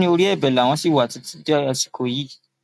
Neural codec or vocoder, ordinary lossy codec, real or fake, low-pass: none; MP3, 96 kbps; real; 14.4 kHz